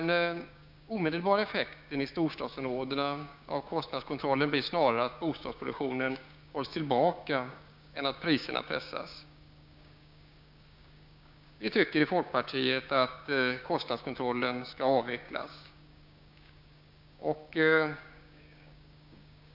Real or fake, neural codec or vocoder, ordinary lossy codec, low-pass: fake; codec, 16 kHz, 6 kbps, DAC; none; 5.4 kHz